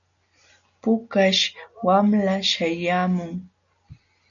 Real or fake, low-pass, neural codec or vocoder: real; 7.2 kHz; none